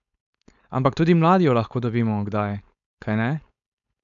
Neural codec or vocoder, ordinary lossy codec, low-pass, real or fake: codec, 16 kHz, 4.8 kbps, FACodec; none; 7.2 kHz; fake